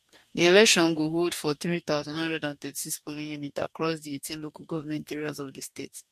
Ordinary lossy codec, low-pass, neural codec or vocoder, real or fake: MP3, 64 kbps; 14.4 kHz; codec, 44.1 kHz, 2.6 kbps, DAC; fake